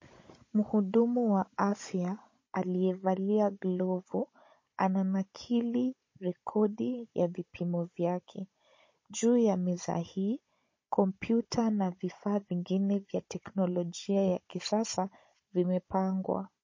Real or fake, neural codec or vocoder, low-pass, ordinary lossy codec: fake; codec, 16 kHz, 16 kbps, FunCodec, trained on Chinese and English, 50 frames a second; 7.2 kHz; MP3, 32 kbps